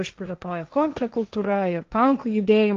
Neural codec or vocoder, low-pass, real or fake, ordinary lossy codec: codec, 16 kHz, 1.1 kbps, Voila-Tokenizer; 7.2 kHz; fake; Opus, 32 kbps